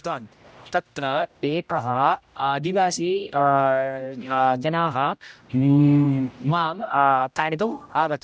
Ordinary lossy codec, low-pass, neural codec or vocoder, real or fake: none; none; codec, 16 kHz, 0.5 kbps, X-Codec, HuBERT features, trained on general audio; fake